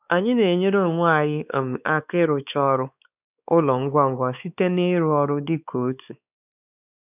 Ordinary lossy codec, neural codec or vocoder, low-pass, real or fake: none; codec, 16 kHz, 4 kbps, X-Codec, WavLM features, trained on Multilingual LibriSpeech; 3.6 kHz; fake